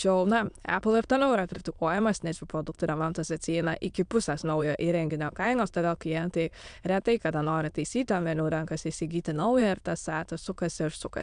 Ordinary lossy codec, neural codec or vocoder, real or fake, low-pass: AAC, 96 kbps; autoencoder, 22.05 kHz, a latent of 192 numbers a frame, VITS, trained on many speakers; fake; 9.9 kHz